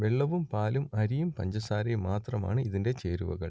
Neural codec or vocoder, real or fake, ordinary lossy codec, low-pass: none; real; none; none